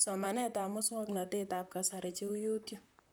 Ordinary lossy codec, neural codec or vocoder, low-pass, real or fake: none; vocoder, 44.1 kHz, 128 mel bands, Pupu-Vocoder; none; fake